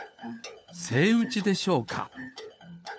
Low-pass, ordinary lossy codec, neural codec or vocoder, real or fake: none; none; codec, 16 kHz, 4 kbps, FunCodec, trained on LibriTTS, 50 frames a second; fake